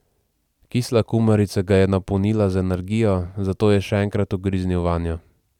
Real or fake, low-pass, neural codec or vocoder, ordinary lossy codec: fake; 19.8 kHz; vocoder, 44.1 kHz, 128 mel bands every 256 samples, BigVGAN v2; none